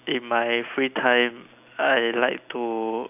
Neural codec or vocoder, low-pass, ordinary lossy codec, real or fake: none; 3.6 kHz; none; real